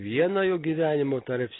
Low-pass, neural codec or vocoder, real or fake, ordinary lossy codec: 7.2 kHz; none; real; AAC, 16 kbps